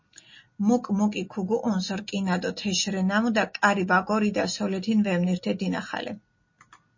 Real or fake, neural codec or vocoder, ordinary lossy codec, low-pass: real; none; MP3, 32 kbps; 7.2 kHz